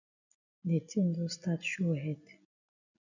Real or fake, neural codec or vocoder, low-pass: real; none; 7.2 kHz